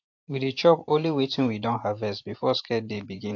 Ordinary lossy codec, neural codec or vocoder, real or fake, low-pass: AAC, 48 kbps; vocoder, 44.1 kHz, 128 mel bands every 512 samples, BigVGAN v2; fake; 7.2 kHz